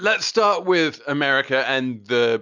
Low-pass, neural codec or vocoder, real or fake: 7.2 kHz; none; real